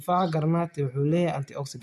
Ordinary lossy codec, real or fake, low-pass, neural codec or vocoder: none; real; 14.4 kHz; none